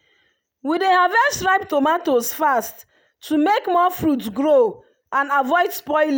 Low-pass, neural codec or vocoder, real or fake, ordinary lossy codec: none; none; real; none